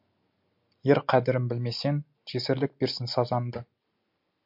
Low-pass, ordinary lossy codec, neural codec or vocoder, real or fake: 5.4 kHz; MP3, 48 kbps; none; real